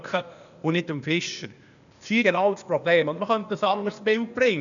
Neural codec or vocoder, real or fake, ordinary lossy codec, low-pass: codec, 16 kHz, 0.8 kbps, ZipCodec; fake; none; 7.2 kHz